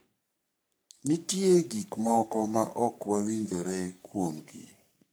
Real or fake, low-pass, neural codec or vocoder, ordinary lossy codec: fake; none; codec, 44.1 kHz, 3.4 kbps, Pupu-Codec; none